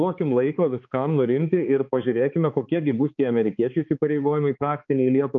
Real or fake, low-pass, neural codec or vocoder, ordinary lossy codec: fake; 7.2 kHz; codec, 16 kHz, 4 kbps, X-Codec, HuBERT features, trained on balanced general audio; MP3, 48 kbps